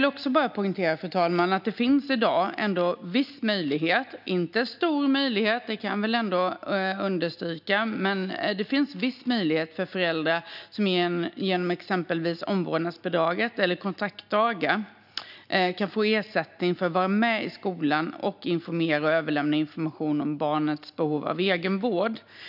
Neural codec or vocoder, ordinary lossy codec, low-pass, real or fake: none; none; 5.4 kHz; real